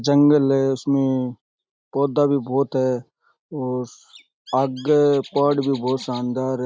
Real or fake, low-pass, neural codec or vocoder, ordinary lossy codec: real; none; none; none